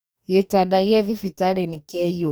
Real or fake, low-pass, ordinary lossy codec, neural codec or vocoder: fake; none; none; codec, 44.1 kHz, 2.6 kbps, DAC